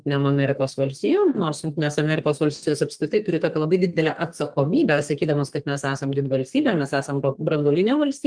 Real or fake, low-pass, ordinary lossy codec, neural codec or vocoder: fake; 9.9 kHz; Opus, 24 kbps; codec, 32 kHz, 1.9 kbps, SNAC